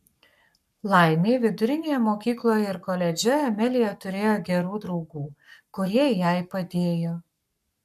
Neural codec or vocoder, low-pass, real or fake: codec, 44.1 kHz, 7.8 kbps, Pupu-Codec; 14.4 kHz; fake